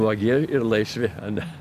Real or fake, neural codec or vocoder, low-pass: real; none; 14.4 kHz